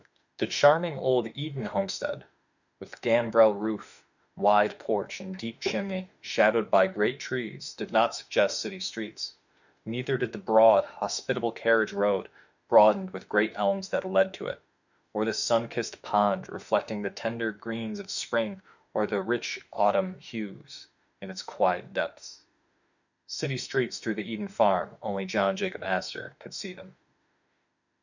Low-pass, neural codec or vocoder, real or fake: 7.2 kHz; autoencoder, 48 kHz, 32 numbers a frame, DAC-VAE, trained on Japanese speech; fake